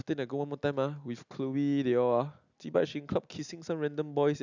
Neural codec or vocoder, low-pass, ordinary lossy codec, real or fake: none; 7.2 kHz; none; real